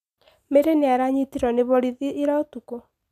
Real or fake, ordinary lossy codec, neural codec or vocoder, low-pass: real; none; none; 14.4 kHz